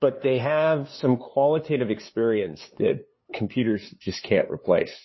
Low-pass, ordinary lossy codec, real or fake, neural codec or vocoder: 7.2 kHz; MP3, 24 kbps; fake; codec, 16 kHz, 2 kbps, FunCodec, trained on LibriTTS, 25 frames a second